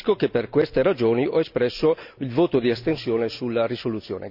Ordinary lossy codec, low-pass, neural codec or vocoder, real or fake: none; 5.4 kHz; none; real